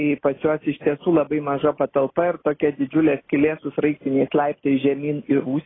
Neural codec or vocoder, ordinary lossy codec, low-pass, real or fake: none; AAC, 16 kbps; 7.2 kHz; real